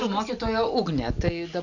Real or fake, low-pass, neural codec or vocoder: real; 7.2 kHz; none